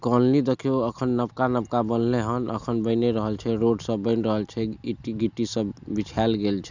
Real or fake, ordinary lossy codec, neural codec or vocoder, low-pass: real; none; none; 7.2 kHz